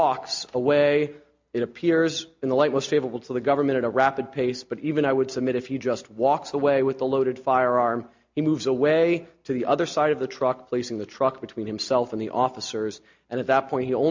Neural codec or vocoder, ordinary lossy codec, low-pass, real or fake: none; MP3, 48 kbps; 7.2 kHz; real